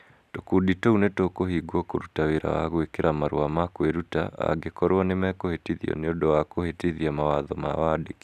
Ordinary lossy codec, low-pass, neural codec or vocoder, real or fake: none; 14.4 kHz; none; real